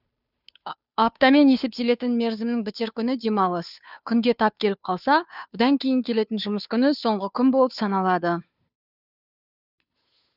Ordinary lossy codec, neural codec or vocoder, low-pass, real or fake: none; codec, 16 kHz, 2 kbps, FunCodec, trained on Chinese and English, 25 frames a second; 5.4 kHz; fake